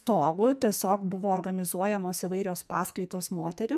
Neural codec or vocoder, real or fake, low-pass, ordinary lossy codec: codec, 44.1 kHz, 2.6 kbps, SNAC; fake; 14.4 kHz; MP3, 96 kbps